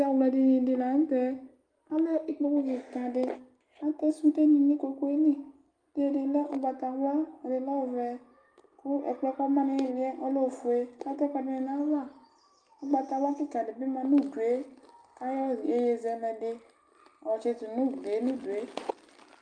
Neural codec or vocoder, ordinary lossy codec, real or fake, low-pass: none; Opus, 32 kbps; real; 9.9 kHz